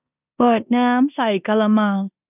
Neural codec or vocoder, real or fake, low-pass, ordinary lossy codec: codec, 16 kHz in and 24 kHz out, 0.9 kbps, LongCat-Audio-Codec, four codebook decoder; fake; 3.6 kHz; none